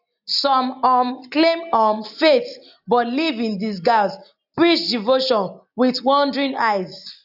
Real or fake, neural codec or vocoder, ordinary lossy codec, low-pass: real; none; AAC, 48 kbps; 5.4 kHz